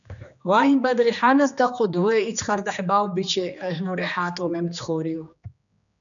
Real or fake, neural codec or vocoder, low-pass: fake; codec, 16 kHz, 2 kbps, X-Codec, HuBERT features, trained on general audio; 7.2 kHz